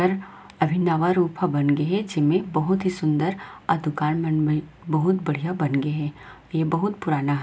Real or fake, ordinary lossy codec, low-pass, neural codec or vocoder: real; none; none; none